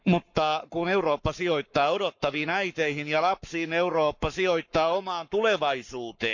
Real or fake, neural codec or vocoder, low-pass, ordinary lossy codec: fake; codec, 44.1 kHz, 7.8 kbps, Pupu-Codec; 7.2 kHz; none